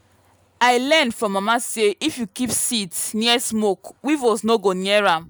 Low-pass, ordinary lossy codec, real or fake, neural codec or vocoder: none; none; real; none